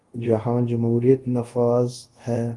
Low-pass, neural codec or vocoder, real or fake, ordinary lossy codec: 10.8 kHz; codec, 24 kHz, 0.5 kbps, DualCodec; fake; Opus, 24 kbps